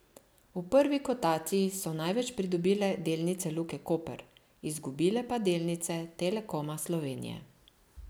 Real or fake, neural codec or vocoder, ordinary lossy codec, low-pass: real; none; none; none